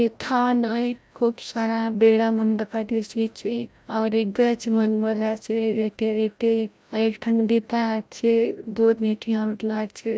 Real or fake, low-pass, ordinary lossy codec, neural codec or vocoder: fake; none; none; codec, 16 kHz, 0.5 kbps, FreqCodec, larger model